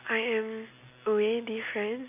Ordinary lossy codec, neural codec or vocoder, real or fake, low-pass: none; none; real; 3.6 kHz